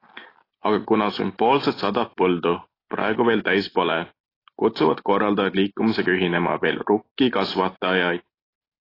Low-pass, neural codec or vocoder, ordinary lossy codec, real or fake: 5.4 kHz; none; AAC, 24 kbps; real